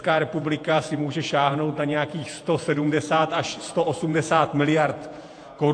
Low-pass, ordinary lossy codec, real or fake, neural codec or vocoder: 9.9 kHz; AAC, 48 kbps; fake; vocoder, 48 kHz, 128 mel bands, Vocos